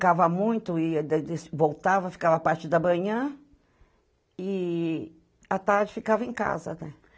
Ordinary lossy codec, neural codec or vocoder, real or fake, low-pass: none; none; real; none